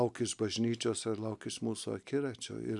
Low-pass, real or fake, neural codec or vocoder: 10.8 kHz; real; none